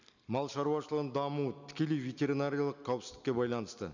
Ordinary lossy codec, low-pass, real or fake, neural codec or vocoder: none; 7.2 kHz; real; none